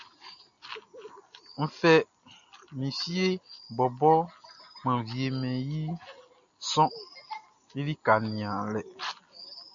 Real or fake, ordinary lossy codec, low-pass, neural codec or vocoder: real; AAC, 64 kbps; 7.2 kHz; none